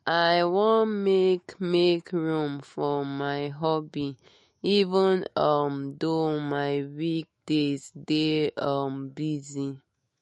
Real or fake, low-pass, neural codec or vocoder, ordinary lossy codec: fake; 19.8 kHz; autoencoder, 48 kHz, 128 numbers a frame, DAC-VAE, trained on Japanese speech; MP3, 48 kbps